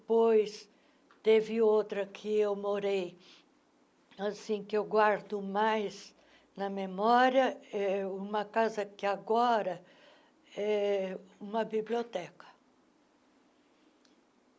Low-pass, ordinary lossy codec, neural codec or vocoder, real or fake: none; none; none; real